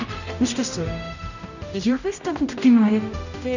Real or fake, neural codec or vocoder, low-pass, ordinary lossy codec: fake; codec, 16 kHz, 0.5 kbps, X-Codec, HuBERT features, trained on general audio; 7.2 kHz; none